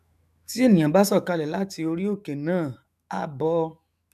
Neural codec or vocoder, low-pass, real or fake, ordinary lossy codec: autoencoder, 48 kHz, 128 numbers a frame, DAC-VAE, trained on Japanese speech; 14.4 kHz; fake; none